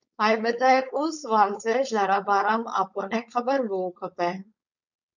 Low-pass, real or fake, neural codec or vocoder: 7.2 kHz; fake; codec, 16 kHz, 4.8 kbps, FACodec